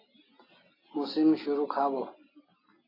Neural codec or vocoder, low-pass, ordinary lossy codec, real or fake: none; 5.4 kHz; AAC, 24 kbps; real